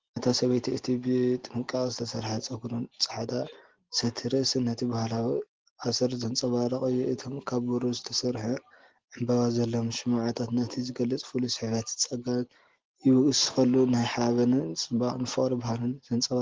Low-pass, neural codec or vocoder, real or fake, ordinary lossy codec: 7.2 kHz; none; real; Opus, 16 kbps